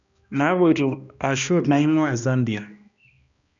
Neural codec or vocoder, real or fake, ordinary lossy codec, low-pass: codec, 16 kHz, 1 kbps, X-Codec, HuBERT features, trained on balanced general audio; fake; none; 7.2 kHz